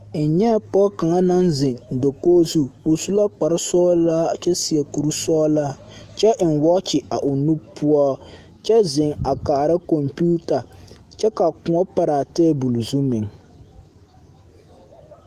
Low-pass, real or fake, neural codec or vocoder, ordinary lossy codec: 14.4 kHz; fake; autoencoder, 48 kHz, 128 numbers a frame, DAC-VAE, trained on Japanese speech; Opus, 24 kbps